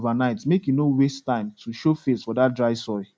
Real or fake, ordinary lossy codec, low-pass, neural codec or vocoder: real; none; none; none